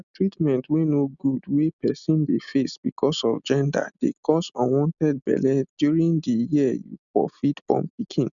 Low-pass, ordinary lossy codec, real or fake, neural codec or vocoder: 7.2 kHz; none; real; none